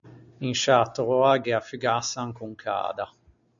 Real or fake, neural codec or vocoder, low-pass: real; none; 7.2 kHz